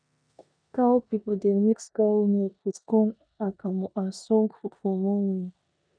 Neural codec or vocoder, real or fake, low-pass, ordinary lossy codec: codec, 16 kHz in and 24 kHz out, 0.9 kbps, LongCat-Audio-Codec, four codebook decoder; fake; 9.9 kHz; none